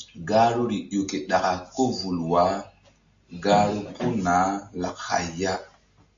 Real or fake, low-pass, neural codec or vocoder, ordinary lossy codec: real; 7.2 kHz; none; AAC, 64 kbps